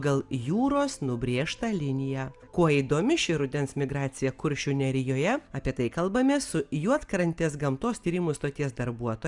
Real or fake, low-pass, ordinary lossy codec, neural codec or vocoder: real; 10.8 kHz; Opus, 64 kbps; none